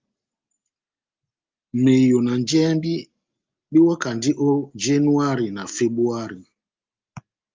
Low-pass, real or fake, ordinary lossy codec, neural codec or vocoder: 7.2 kHz; real; Opus, 32 kbps; none